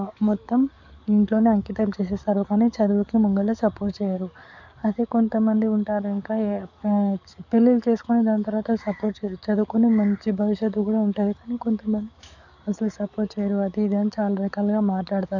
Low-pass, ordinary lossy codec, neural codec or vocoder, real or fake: 7.2 kHz; none; codec, 44.1 kHz, 7.8 kbps, DAC; fake